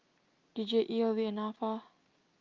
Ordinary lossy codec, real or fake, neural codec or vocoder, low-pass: Opus, 32 kbps; real; none; 7.2 kHz